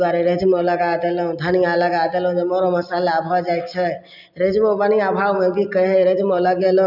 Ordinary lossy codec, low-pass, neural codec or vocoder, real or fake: none; 5.4 kHz; none; real